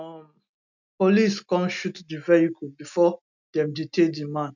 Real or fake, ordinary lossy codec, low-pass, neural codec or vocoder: real; none; 7.2 kHz; none